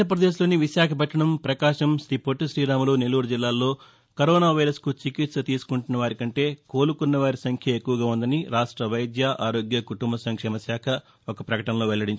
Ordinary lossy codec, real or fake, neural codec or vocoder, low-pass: none; real; none; none